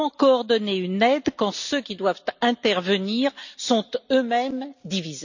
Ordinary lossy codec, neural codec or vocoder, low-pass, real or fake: none; none; 7.2 kHz; real